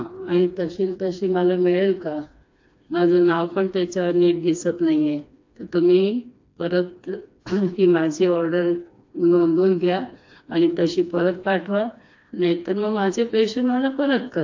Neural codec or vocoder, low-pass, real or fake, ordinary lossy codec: codec, 16 kHz, 2 kbps, FreqCodec, smaller model; 7.2 kHz; fake; AAC, 48 kbps